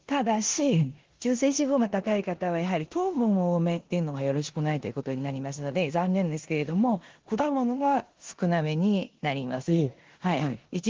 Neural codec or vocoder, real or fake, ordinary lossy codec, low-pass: codec, 16 kHz in and 24 kHz out, 0.9 kbps, LongCat-Audio-Codec, four codebook decoder; fake; Opus, 16 kbps; 7.2 kHz